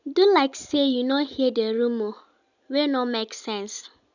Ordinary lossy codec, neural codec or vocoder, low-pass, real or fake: none; none; 7.2 kHz; real